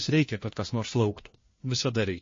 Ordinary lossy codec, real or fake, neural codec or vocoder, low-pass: MP3, 32 kbps; fake; codec, 16 kHz, 1 kbps, FunCodec, trained on LibriTTS, 50 frames a second; 7.2 kHz